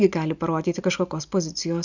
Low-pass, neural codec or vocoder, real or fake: 7.2 kHz; none; real